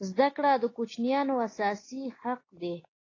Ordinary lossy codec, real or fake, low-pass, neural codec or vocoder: AAC, 32 kbps; real; 7.2 kHz; none